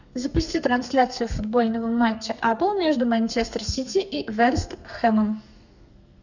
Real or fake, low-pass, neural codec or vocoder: fake; 7.2 kHz; codec, 32 kHz, 1.9 kbps, SNAC